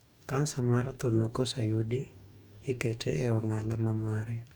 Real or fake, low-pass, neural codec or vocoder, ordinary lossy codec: fake; 19.8 kHz; codec, 44.1 kHz, 2.6 kbps, DAC; none